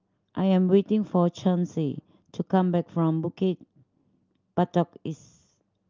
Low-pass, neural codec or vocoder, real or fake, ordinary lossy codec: 7.2 kHz; none; real; Opus, 24 kbps